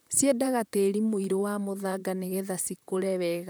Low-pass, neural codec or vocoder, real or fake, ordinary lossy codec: none; vocoder, 44.1 kHz, 128 mel bands, Pupu-Vocoder; fake; none